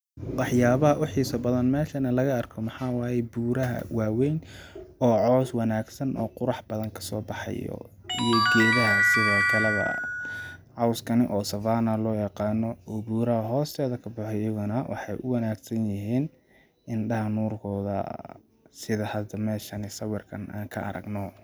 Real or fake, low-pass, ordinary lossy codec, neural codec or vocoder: real; none; none; none